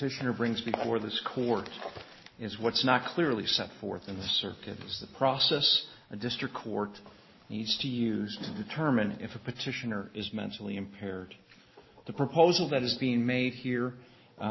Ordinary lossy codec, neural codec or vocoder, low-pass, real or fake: MP3, 24 kbps; none; 7.2 kHz; real